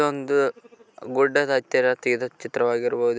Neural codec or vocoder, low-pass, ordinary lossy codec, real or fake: none; none; none; real